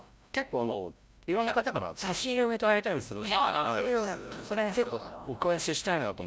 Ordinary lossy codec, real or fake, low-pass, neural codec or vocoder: none; fake; none; codec, 16 kHz, 0.5 kbps, FreqCodec, larger model